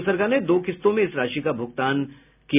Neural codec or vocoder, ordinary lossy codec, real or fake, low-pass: none; none; real; 3.6 kHz